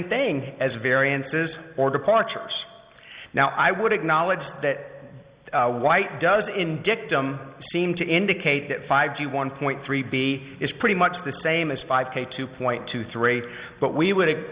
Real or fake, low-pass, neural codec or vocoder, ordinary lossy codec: real; 3.6 kHz; none; Opus, 64 kbps